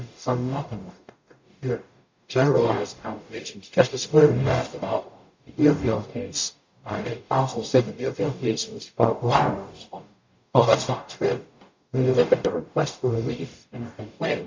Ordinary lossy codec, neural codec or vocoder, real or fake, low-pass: MP3, 64 kbps; codec, 44.1 kHz, 0.9 kbps, DAC; fake; 7.2 kHz